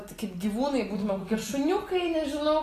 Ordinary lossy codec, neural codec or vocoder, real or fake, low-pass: AAC, 48 kbps; none; real; 14.4 kHz